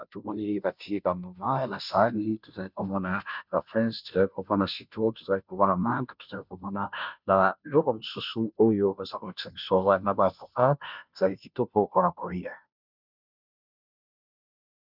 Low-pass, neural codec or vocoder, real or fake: 5.4 kHz; codec, 16 kHz, 0.5 kbps, FunCodec, trained on Chinese and English, 25 frames a second; fake